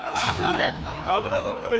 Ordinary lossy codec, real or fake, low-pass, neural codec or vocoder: none; fake; none; codec, 16 kHz, 2 kbps, FreqCodec, larger model